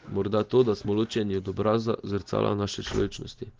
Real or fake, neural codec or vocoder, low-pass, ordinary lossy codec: real; none; 7.2 kHz; Opus, 16 kbps